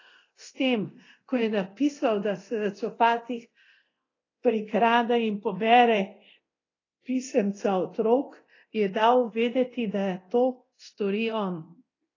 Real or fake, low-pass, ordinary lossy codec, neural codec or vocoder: fake; 7.2 kHz; AAC, 32 kbps; codec, 24 kHz, 0.9 kbps, DualCodec